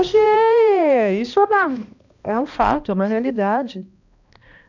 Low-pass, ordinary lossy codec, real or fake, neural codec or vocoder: 7.2 kHz; none; fake; codec, 16 kHz, 1 kbps, X-Codec, HuBERT features, trained on balanced general audio